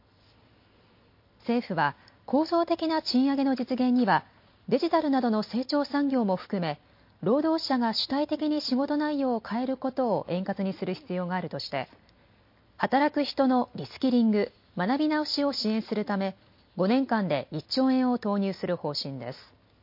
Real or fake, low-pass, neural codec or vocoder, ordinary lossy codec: real; 5.4 kHz; none; MP3, 32 kbps